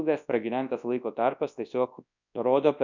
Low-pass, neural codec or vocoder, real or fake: 7.2 kHz; codec, 24 kHz, 0.9 kbps, WavTokenizer, large speech release; fake